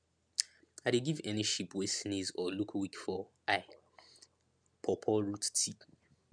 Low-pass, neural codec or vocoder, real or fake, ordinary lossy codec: 9.9 kHz; none; real; none